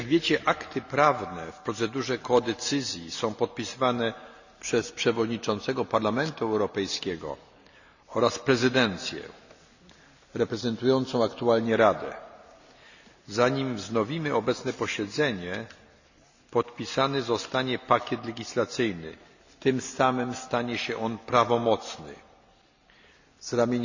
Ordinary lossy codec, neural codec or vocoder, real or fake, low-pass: none; none; real; 7.2 kHz